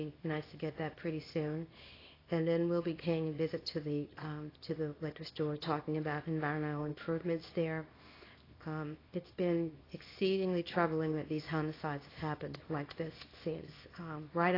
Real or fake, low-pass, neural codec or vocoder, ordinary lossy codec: fake; 5.4 kHz; codec, 24 kHz, 0.9 kbps, WavTokenizer, small release; AAC, 24 kbps